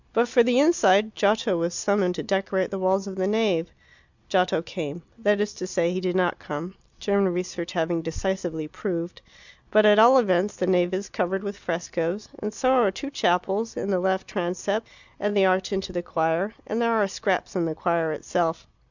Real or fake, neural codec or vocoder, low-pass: real; none; 7.2 kHz